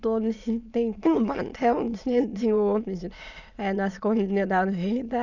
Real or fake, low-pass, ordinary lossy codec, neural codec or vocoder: fake; 7.2 kHz; none; autoencoder, 22.05 kHz, a latent of 192 numbers a frame, VITS, trained on many speakers